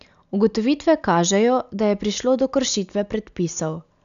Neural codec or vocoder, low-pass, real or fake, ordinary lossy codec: none; 7.2 kHz; real; none